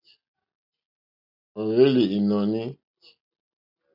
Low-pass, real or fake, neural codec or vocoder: 5.4 kHz; real; none